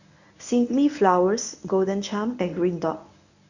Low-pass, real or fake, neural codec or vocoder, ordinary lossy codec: 7.2 kHz; fake; codec, 24 kHz, 0.9 kbps, WavTokenizer, medium speech release version 1; none